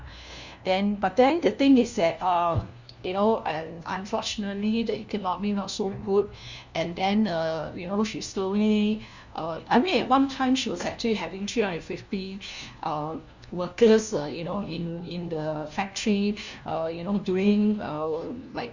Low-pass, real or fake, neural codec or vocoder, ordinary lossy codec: 7.2 kHz; fake; codec, 16 kHz, 1 kbps, FunCodec, trained on LibriTTS, 50 frames a second; Opus, 64 kbps